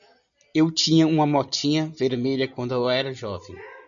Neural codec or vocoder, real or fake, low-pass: none; real; 7.2 kHz